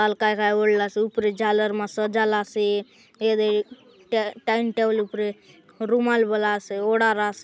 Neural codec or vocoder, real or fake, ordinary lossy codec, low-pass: none; real; none; none